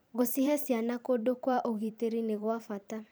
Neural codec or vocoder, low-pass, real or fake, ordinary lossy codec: vocoder, 44.1 kHz, 128 mel bands every 512 samples, BigVGAN v2; none; fake; none